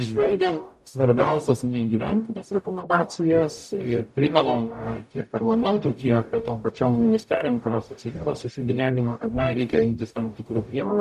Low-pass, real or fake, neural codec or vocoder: 14.4 kHz; fake; codec, 44.1 kHz, 0.9 kbps, DAC